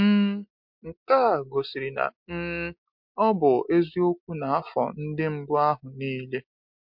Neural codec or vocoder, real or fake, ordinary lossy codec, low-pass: none; real; MP3, 48 kbps; 5.4 kHz